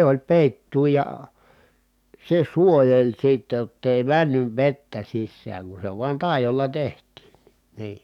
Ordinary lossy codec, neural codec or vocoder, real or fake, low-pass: none; codec, 44.1 kHz, 7.8 kbps, DAC; fake; 19.8 kHz